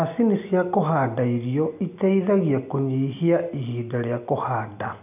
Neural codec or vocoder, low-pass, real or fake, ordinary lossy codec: none; 3.6 kHz; real; none